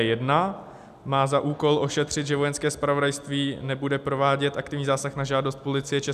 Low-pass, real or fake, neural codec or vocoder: 14.4 kHz; real; none